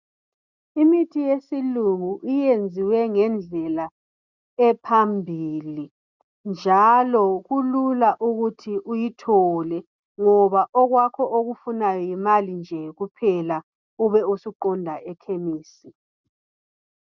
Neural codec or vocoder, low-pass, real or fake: none; 7.2 kHz; real